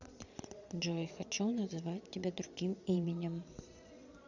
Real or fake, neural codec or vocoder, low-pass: fake; vocoder, 22.05 kHz, 80 mel bands, Vocos; 7.2 kHz